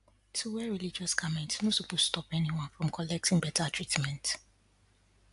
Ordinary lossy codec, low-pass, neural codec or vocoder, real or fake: AAC, 64 kbps; 10.8 kHz; none; real